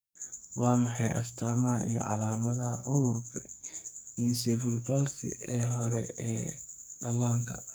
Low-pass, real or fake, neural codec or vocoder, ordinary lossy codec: none; fake; codec, 44.1 kHz, 2.6 kbps, SNAC; none